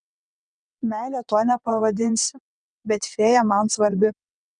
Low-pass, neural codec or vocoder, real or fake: 9.9 kHz; vocoder, 22.05 kHz, 80 mel bands, WaveNeXt; fake